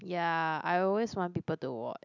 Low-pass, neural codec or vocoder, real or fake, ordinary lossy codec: 7.2 kHz; none; real; none